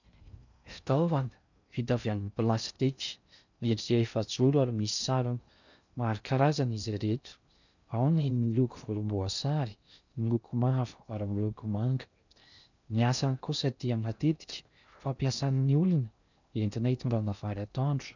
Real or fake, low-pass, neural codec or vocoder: fake; 7.2 kHz; codec, 16 kHz in and 24 kHz out, 0.6 kbps, FocalCodec, streaming, 4096 codes